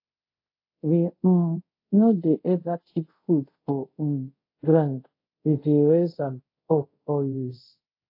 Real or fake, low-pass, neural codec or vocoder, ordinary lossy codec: fake; 5.4 kHz; codec, 24 kHz, 0.5 kbps, DualCodec; AAC, 32 kbps